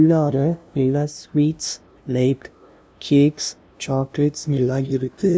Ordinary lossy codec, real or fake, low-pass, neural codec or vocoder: none; fake; none; codec, 16 kHz, 0.5 kbps, FunCodec, trained on LibriTTS, 25 frames a second